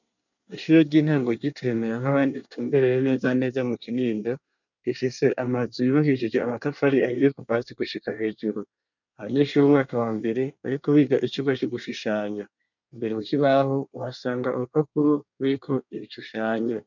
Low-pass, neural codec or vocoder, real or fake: 7.2 kHz; codec, 24 kHz, 1 kbps, SNAC; fake